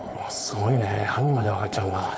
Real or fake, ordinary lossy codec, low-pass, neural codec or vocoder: fake; none; none; codec, 16 kHz, 4.8 kbps, FACodec